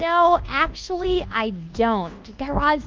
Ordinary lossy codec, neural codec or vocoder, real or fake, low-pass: Opus, 32 kbps; codec, 24 kHz, 1.2 kbps, DualCodec; fake; 7.2 kHz